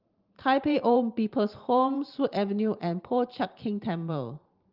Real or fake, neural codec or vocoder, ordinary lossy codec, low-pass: fake; vocoder, 44.1 kHz, 128 mel bands every 512 samples, BigVGAN v2; Opus, 32 kbps; 5.4 kHz